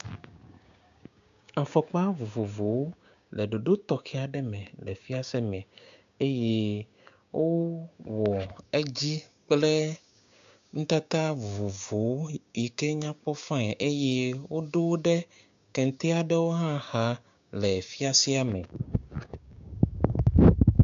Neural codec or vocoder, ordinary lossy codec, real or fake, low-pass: codec, 16 kHz, 6 kbps, DAC; AAC, 64 kbps; fake; 7.2 kHz